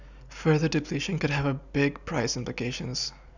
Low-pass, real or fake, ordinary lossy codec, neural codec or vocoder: 7.2 kHz; real; none; none